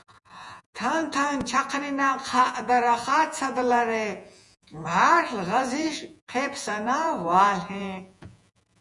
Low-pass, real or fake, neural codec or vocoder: 10.8 kHz; fake; vocoder, 48 kHz, 128 mel bands, Vocos